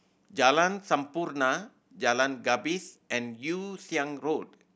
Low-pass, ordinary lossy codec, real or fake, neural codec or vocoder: none; none; real; none